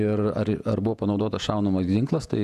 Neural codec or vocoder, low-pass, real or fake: vocoder, 48 kHz, 128 mel bands, Vocos; 14.4 kHz; fake